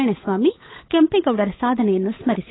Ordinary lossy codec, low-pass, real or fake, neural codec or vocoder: AAC, 16 kbps; 7.2 kHz; fake; codec, 16 kHz, 8 kbps, FunCodec, trained on Chinese and English, 25 frames a second